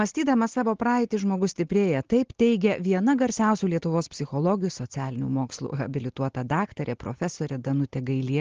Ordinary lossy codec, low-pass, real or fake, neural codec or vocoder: Opus, 16 kbps; 7.2 kHz; real; none